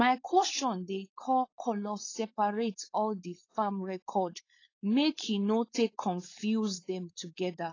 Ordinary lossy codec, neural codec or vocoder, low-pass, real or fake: AAC, 32 kbps; codec, 16 kHz, 4.8 kbps, FACodec; 7.2 kHz; fake